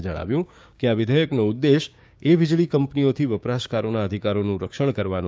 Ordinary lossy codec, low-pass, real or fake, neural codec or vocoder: none; none; fake; codec, 16 kHz, 6 kbps, DAC